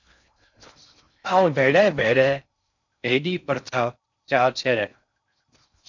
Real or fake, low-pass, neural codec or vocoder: fake; 7.2 kHz; codec, 16 kHz in and 24 kHz out, 0.6 kbps, FocalCodec, streaming, 4096 codes